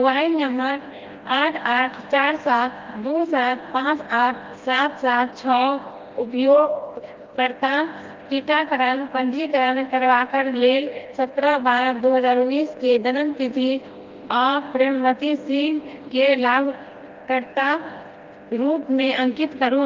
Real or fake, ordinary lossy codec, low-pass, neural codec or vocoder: fake; Opus, 32 kbps; 7.2 kHz; codec, 16 kHz, 1 kbps, FreqCodec, smaller model